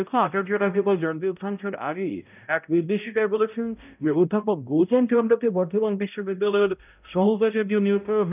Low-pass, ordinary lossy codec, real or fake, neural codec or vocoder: 3.6 kHz; none; fake; codec, 16 kHz, 0.5 kbps, X-Codec, HuBERT features, trained on balanced general audio